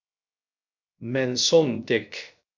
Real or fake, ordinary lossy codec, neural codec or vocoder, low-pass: fake; MP3, 64 kbps; codec, 16 kHz, 0.3 kbps, FocalCodec; 7.2 kHz